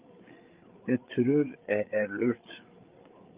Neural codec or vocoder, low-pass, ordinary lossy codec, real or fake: codec, 16 kHz, 8 kbps, FreqCodec, larger model; 3.6 kHz; Opus, 32 kbps; fake